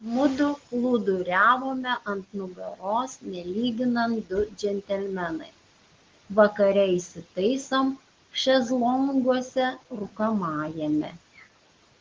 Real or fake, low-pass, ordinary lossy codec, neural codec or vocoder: real; 7.2 kHz; Opus, 16 kbps; none